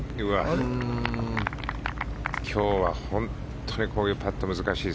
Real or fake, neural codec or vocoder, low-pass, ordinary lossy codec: real; none; none; none